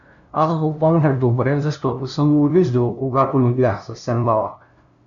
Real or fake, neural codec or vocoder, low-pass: fake; codec, 16 kHz, 0.5 kbps, FunCodec, trained on LibriTTS, 25 frames a second; 7.2 kHz